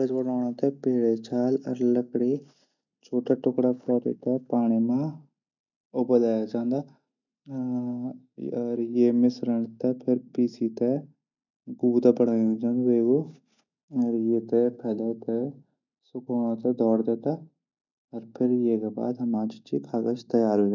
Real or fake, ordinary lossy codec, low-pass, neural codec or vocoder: real; none; 7.2 kHz; none